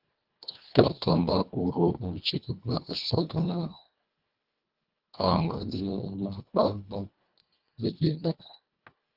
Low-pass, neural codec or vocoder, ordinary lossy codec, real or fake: 5.4 kHz; codec, 24 kHz, 1.5 kbps, HILCodec; Opus, 24 kbps; fake